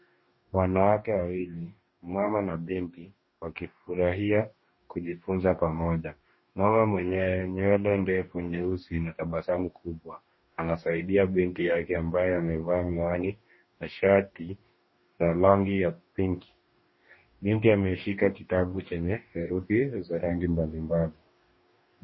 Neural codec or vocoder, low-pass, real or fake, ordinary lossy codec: codec, 44.1 kHz, 2.6 kbps, DAC; 7.2 kHz; fake; MP3, 24 kbps